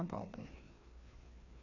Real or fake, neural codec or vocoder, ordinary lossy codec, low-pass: fake; codec, 16 kHz, 2 kbps, FreqCodec, larger model; none; 7.2 kHz